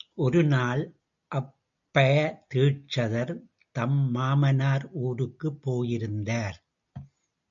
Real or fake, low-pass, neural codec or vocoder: real; 7.2 kHz; none